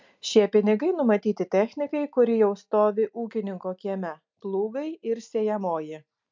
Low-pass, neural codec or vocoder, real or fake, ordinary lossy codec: 7.2 kHz; none; real; MP3, 64 kbps